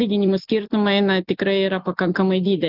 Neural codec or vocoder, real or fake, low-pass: none; real; 5.4 kHz